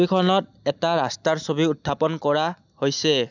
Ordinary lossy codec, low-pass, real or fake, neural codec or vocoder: none; 7.2 kHz; real; none